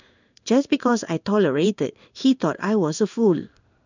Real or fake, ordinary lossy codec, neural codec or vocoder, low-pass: fake; none; codec, 16 kHz in and 24 kHz out, 1 kbps, XY-Tokenizer; 7.2 kHz